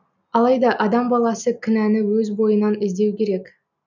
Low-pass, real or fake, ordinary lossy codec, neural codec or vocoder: 7.2 kHz; real; none; none